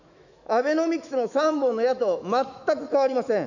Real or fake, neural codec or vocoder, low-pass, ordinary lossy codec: fake; autoencoder, 48 kHz, 128 numbers a frame, DAC-VAE, trained on Japanese speech; 7.2 kHz; none